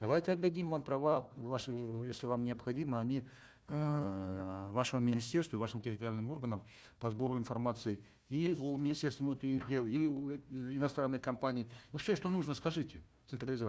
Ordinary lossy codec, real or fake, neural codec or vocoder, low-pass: none; fake; codec, 16 kHz, 1 kbps, FunCodec, trained on Chinese and English, 50 frames a second; none